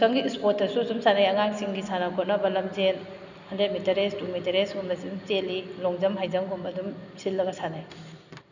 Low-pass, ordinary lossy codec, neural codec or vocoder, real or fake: 7.2 kHz; none; none; real